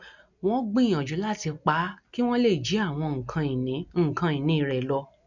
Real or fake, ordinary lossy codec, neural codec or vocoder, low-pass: real; none; none; 7.2 kHz